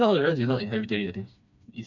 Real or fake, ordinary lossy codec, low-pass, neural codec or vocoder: fake; none; 7.2 kHz; codec, 16 kHz, 2 kbps, FreqCodec, smaller model